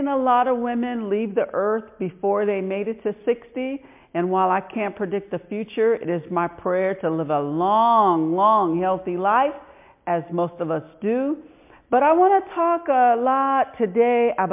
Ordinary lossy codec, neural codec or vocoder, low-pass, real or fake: MP3, 32 kbps; none; 3.6 kHz; real